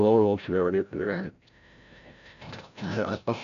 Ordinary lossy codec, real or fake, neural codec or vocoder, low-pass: none; fake; codec, 16 kHz, 0.5 kbps, FreqCodec, larger model; 7.2 kHz